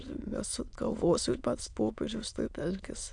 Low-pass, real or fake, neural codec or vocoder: 9.9 kHz; fake; autoencoder, 22.05 kHz, a latent of 192 numbers a frame, VITS, trained on many speakers